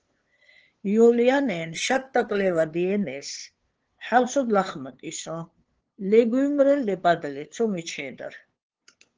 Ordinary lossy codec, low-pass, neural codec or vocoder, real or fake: Opus, 16 kbps; 7.2 kHz; codec, 16 kHz, 2 kbps, FunCodec, trained on LibriTTS, 25 frames a second; fake